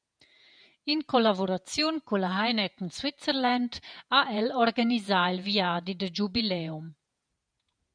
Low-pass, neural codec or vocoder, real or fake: 9.9 kHz; vocoder, 48 kHz, 128 mel bands, Vocos; fake